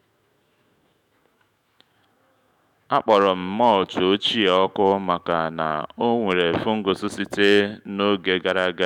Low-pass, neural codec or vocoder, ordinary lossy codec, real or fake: 19.8 kHz; autoencoder, 48 kHz, 128 numbers a frame, DAC-VAE, trained on Japanese speech; none; fake